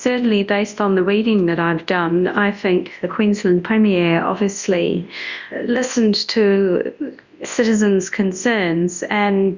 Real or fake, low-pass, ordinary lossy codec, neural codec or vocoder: fake; 7.2 kHz; Opus, 64 kbps; codec, 24 kHz, 0.9 kbps, WavTokenizer, large speech release